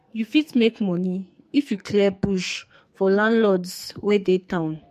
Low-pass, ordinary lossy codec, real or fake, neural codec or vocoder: 14.4 kHz; MP3, 64 kbps; fake; codec, 44.1 kHz, 2.6 kbps, SNAC